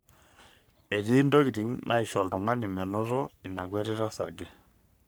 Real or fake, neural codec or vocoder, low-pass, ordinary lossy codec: fake; codec, 44.1 kHz, 3.4 kbps, Pupu-Codec; none; none